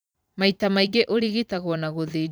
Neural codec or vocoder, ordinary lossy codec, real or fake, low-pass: vocoder, 44.1 kHz, 128 mel bands every 512 samples, BigVGAN v2; none; fake; none